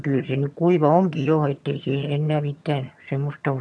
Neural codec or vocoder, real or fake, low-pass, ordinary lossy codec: vocoder, 22.05 kHz, 80 mel bands, HiFi-GAN; fake; none; none